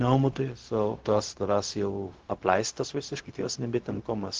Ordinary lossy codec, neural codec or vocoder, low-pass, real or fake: Opus, 16 kbps; codec, 16 kHz, 0.4 kbps, LongCat-Audio-Codec; 7.2 kHz; fake